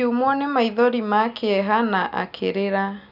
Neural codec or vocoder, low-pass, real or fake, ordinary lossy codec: none; 5.4 kHz; real; none